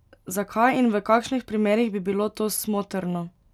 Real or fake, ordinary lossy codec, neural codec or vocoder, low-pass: real; none; none; 19.8 kHz